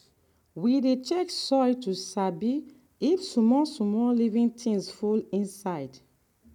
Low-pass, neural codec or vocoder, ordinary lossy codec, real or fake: 19.8 kHz; none; none; real